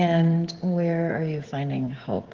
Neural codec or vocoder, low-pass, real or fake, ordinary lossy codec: codec, 16 kHz, 16 kbps, FreqCodec, smaller model; 7.2 kHz; fake; Opus, 16 kbps